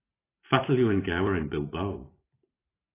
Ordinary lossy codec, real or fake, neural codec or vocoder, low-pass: AAC, 16 kbps; real; none; 3.6 kHz